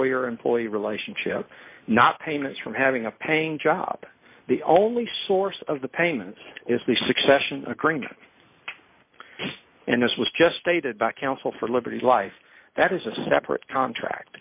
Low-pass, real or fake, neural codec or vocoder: 3.6 kHz; real; none